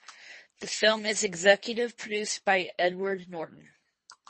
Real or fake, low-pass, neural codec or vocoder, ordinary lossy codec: fake; 10.8 kHz; codec, 24 kHz, 3 kbps, HILCodec; MP3, 32 kbps